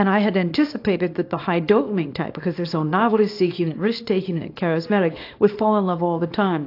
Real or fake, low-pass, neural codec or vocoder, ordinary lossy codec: fake; 5.4 kHz; codec, 24 kHz, 0.9 kbps, WavTokenizer, small release; AAC, 32 kbps